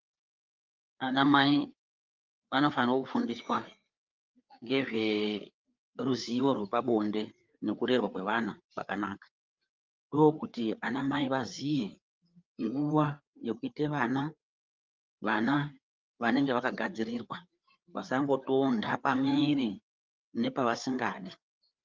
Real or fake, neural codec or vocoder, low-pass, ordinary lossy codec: fake; codec, 16 kHz, 4 kbps, FreqCodec, larger model; 7.2 kHz; Opus, 24 kbps